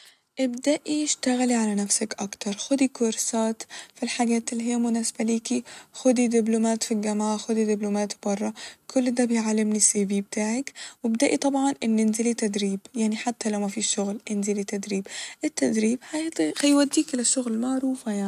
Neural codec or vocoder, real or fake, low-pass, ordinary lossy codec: none; real; 14.4 kHz; none